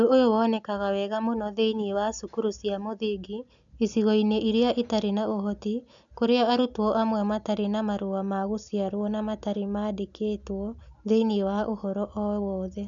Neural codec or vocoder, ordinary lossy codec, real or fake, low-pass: none; none; real; 7.2 kHz